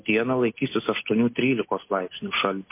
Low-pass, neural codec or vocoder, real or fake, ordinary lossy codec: 3.6 kHz; none; real; MP3, 24 kbps